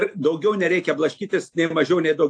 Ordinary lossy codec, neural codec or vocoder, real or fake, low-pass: AAC, 48 kbps; none; real; 9.9 kHz